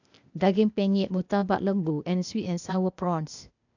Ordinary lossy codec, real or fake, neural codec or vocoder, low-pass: none; fake; codec, 16 kHz, 0.8 kbps, ZipCodec; 7.2 kHz